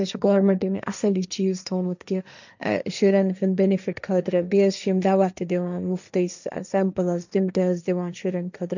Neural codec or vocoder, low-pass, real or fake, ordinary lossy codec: codec, 16 kHz, 1.1 kbps, Voila-Tokenizer; 7.2 kHz; fake; none